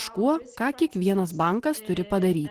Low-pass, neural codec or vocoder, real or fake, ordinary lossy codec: 14.4 kHz; none; real; Opus, 16 kbps